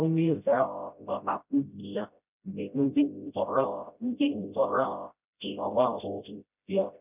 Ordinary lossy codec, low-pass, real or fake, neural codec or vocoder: none; 3.6 kHz; fake; codec, 16 kHz, 0.5 kbps, FreqCodec, smaller model